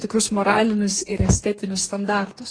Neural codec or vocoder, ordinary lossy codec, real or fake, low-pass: codec, 44.1 kHz, 2.6 kbps, DAC; AAC, 32 kbps; fake; 9.9 kHz